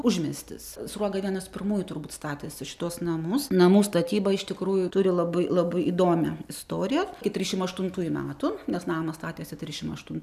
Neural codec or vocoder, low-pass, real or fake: none; 14.4 kHz; real